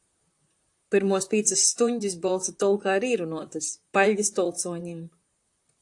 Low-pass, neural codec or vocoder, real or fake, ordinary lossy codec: 10.8 kHz; vocoder, 44.1 kHz, 128 mel bands, Pupu-Vocoder; fake; AAC, 64 kbps